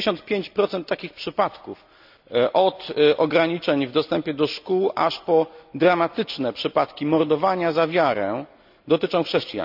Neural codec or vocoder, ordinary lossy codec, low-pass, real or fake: none; none; 5.4 kHz; real